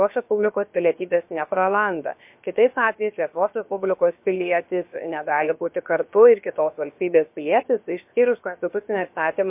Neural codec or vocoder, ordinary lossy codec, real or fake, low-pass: codec, 16 kHz, about 1 kbps, DyCAST, with the encoder's durations; MP3, 32 kbps; fake; 3.6 kHz